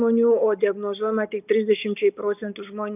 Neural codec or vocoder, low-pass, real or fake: none; 3.6 kHz; real